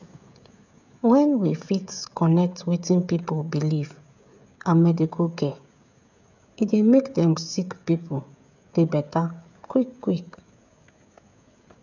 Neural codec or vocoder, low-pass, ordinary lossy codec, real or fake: codec, 16 kHz, 16 kbps, FreqCodec, smaller model; 7.2 kHz; none; fake